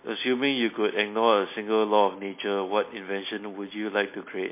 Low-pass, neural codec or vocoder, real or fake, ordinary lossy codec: 3.6 kHz; none; real; MP3, 24 kbps